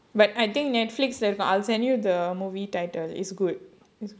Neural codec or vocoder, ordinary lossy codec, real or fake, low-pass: none; none; real; none